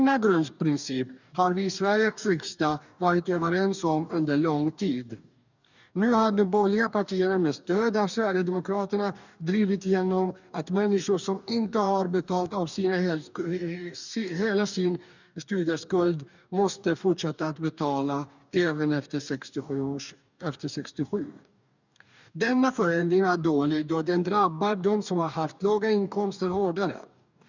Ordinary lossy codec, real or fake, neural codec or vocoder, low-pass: none; fake; codec, 44.1 kHz, 2.6 kbps, DAC; 7.2 kHz